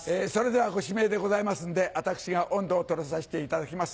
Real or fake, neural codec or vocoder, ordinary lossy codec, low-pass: real; none; none; none